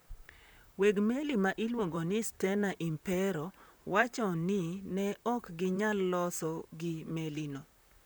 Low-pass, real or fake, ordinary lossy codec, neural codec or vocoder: none; fake; none; vocoder, 44.1 kHz, 128 mel bands, Pupu-Vocoder